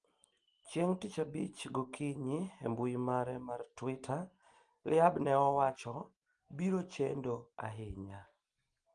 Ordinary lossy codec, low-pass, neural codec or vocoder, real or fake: Opus, 24 kbps; 9.9 kHz; none; real